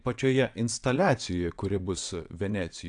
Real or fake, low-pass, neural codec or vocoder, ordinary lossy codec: fake; 9.9 kHz; vocoder, 22.05 kHz, 80 mel bands, WaveNeXt; AAC, 64 kbps